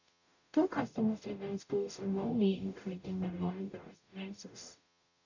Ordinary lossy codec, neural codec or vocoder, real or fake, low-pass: none; codec, 44.1 kHz, 0.9 kbps, DAC; fake; 7.2 kHz